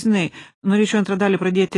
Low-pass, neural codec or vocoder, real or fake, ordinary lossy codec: 10.8 kHz; none; real; AAC, 32 kbps